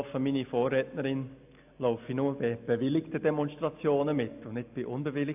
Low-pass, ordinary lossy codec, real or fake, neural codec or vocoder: 3.6 kHz; AAC, 32 kbps; real; none